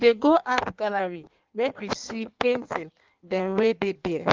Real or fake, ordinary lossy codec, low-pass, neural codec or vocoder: fake; Opus, 32 kbps; 7.2 kHz; codec, 16 kHz in and 24 kHz out, 1.1 kbps, FireRedTTS-2 codec